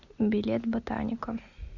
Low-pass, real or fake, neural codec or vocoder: 7.2 kHz; real; none